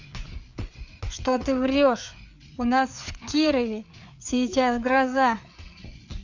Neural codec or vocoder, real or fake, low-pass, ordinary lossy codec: codec, 16 kHz, 4 kbps, FreqCodec, larger model; fake; 7.2 kHz; none